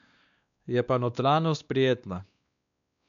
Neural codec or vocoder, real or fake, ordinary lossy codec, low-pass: codec, 16 kHz, 2 kbps, X-Codec, WavLM features, trained on Multilingual LibriSpeech; fake; none; 7.2 kHz